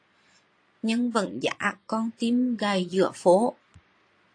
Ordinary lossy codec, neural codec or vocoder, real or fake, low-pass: AAC, 48 kbps; vocoder, 22.05 kHz, 80 mel bands, Vocos; fake; 9.9 kHz